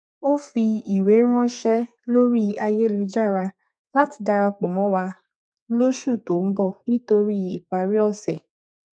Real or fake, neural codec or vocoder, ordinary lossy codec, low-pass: fake; codec, 32 kHz, 1.9 kbps, SNAC; none; 9.9 kHz